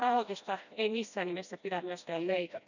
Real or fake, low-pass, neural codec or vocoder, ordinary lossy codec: fake; 7.2 kHz; codec, 16 kHz, 1 kbps, FreqCodec, smaller model; none